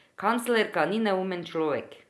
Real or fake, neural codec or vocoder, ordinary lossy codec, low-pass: real; none; none; none